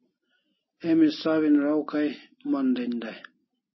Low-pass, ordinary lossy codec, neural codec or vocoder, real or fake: 7.2 kHz; MP3, 24 kbps; none; real